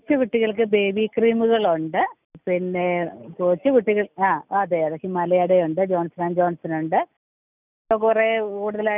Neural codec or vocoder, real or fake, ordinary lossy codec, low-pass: none; real; none; 3.6 kHz